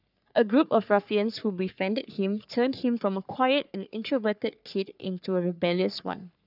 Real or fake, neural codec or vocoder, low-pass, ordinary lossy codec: fake; codec, 44.1 kHz, 3.4 kbps, Pupu-Codec; 5.4 kHz; none